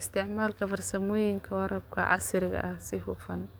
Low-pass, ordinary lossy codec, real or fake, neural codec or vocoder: none; none; fake; codec, 44.1 kHz, 7.8 kbps, DAC